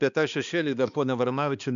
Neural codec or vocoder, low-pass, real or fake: codec, 16 kHz, 2 kbps, X-Codec, HuBERT features, trained on balanced general audio; 7.2 kHz; fake